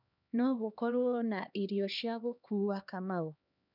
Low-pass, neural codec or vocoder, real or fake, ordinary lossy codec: 5.4 kHz; codec, 16 kHz, 2 kbps, X-Codec, HuBERT features, trained on LibriSpeech; fake; none